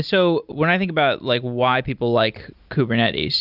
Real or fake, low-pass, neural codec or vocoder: real; 5.4 kHz; none